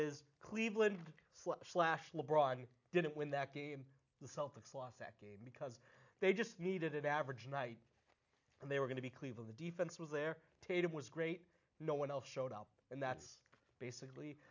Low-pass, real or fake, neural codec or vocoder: 7.2 kHz; real; none